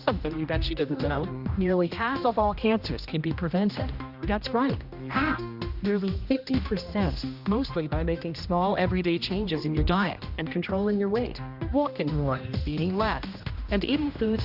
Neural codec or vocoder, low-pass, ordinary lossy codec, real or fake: codec, 16 kHz, 1 kbps, X-Codec, HuBERT features, trained on general audio; 5.4 kHz; Opus, 64 kbps; fake